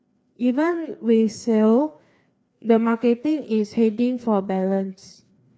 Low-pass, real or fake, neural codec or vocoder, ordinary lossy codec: none; fake; codec, 16 kHz, 2 kbps, FreqCodec, larger model; none